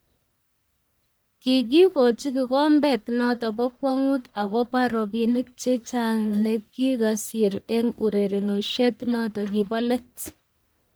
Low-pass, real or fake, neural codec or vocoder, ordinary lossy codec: none; fake; codec, 44.1 kHz, 1.7 kbps, Pupu-Codec; none